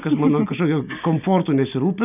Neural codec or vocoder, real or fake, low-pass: none; real; 3.6 kHz